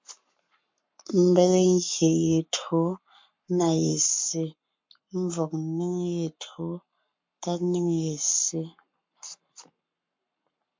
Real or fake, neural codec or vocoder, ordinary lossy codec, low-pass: fake; codec, 44.1 kHz, 7.8 kbps, Pupu-Codec; MP3, 48 kbps; 7.2 kHz